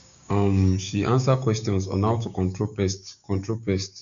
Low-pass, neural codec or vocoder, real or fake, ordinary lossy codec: 7.2 kHz; codec, 16 kHz, 6 kbps, DAC; fake; none